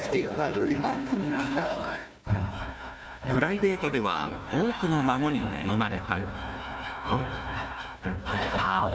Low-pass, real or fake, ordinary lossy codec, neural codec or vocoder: none; fake; none; codec, 16 kHz, 1 kbps, FunCodec, trained on Chinese and English, 50 frames a second